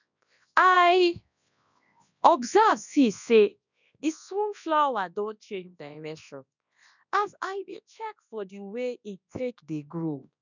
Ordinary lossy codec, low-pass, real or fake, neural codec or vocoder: none; 7.2 kHz; fake; codec, 24 kHz, 0.9 kbps, WavTokenizer, large speech release